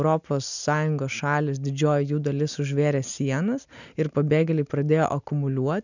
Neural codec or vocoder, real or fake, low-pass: none; real; 7.2 kHz